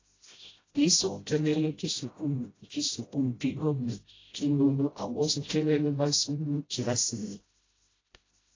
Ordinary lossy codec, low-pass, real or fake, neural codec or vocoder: AAC, 32 kbps; 7.2 kHz; fake; codec, 16 kHz, 0.5 kbps, FreqCodec, smaller model